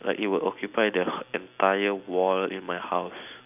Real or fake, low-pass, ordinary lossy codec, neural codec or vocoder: real; 3.6 kHz; none; none